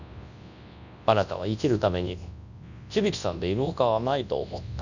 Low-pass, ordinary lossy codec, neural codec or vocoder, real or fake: 7.2 kHz; none; codec, 24 kHz, 0.9 kbps, WavTokenizer, large speech release; fake